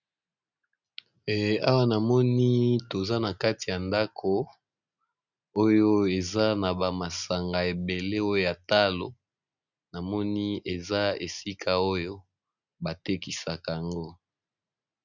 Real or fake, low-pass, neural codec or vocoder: real; 7.2 kHz; none